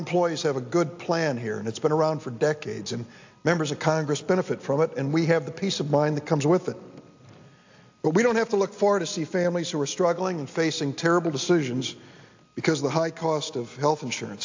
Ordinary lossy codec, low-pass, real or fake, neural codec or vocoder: AAC, 48 kbps; 7.2 kHz; real; none